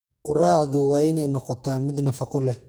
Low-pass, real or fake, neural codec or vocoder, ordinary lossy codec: none; fake; codec, 44.1 kHz, 2.6 kbps, DAC; none